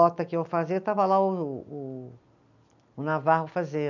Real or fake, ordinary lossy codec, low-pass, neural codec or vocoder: real; none; 7.2 kHz; none